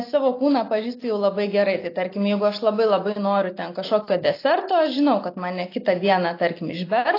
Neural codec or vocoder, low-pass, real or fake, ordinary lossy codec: none; 5.4 kHz; real; AAC, 32 kbps